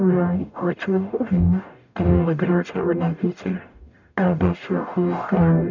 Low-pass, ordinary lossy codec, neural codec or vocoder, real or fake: 7.2 kHz; none; codec, 44.1 kHz, 0.9 kbps, DAC; fake